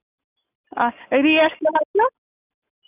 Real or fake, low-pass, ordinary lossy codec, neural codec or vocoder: real; 3.6 kHz; none; none